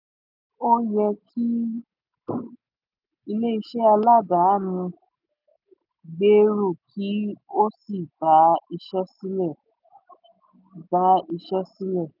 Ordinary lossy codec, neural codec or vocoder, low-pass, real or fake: none; none; 5.4 kHz; real